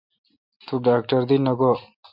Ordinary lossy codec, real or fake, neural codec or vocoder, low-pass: MP3, 48 kbps; real; none; 5.4 kHz